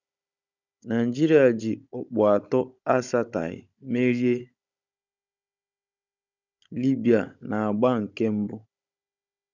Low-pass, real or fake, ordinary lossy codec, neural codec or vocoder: 7.2 kHz; fake; none; codec, 16 kHz, 16 kbps, FunCodec, trained on Chinese and English, 50 frames a second